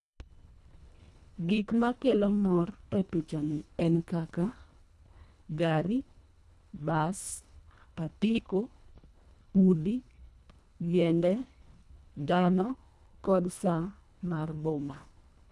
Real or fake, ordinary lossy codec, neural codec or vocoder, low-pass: fake; none; codec, 24 kHz, 1.5 kbps, HILCodec; none